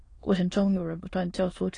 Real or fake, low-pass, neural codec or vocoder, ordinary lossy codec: fake; 9.9 kHz; autoencoder, 22.05 kHz, a latent of 192 numbers a frame, VITS, trained on many speakers; AAC, 32 kbps